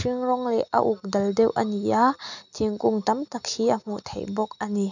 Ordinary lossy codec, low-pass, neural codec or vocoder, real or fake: none; 7.2 kHz; none; real